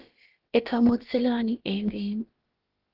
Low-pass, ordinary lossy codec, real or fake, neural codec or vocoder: 5.4 kHz; Opus, 16 kbps; fake; codec, 16 kHz, about 1 kbps, DyCAST, with the encoder's durations